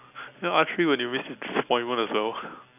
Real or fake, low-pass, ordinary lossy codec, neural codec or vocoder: real; 3.6 kHz; none; none